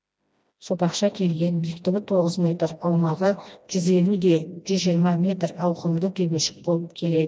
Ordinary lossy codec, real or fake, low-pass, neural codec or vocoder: none; fake; none; codec, 16 kHz, 1 kbps, FreqCodec, smaller model